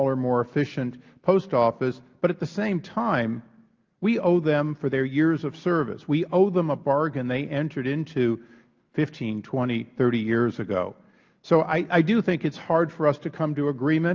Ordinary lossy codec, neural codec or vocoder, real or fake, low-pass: Opus, 32 kbps; none; real; 7.2 kHz